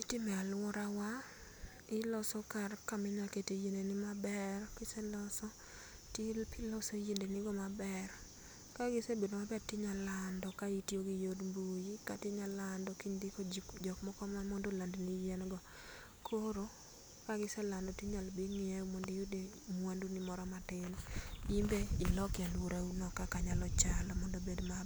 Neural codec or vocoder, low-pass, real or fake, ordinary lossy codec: none; none; real; none